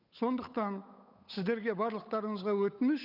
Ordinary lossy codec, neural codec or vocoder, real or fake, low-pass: none; codec, 16 kHz, 8 kbps, FunCodec, trained on Chinese and English, 25 frames a second; fake; 5.4 kHz